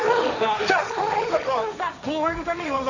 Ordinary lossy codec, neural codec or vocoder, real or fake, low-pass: none; codec, 16 kHz, 1.1 kbps, Voila-Tokenizer; fake; 7.2 kHz